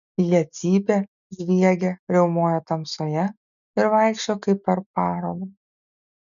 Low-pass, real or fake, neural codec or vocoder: 7.2 kHz; real; none